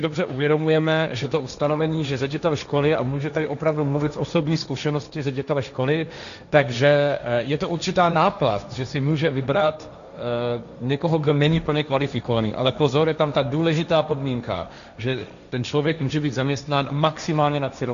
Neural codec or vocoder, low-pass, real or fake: codec, 16 kHz, 1.1 kbps, Voila-Tokenizer; 7.2 kHz; fake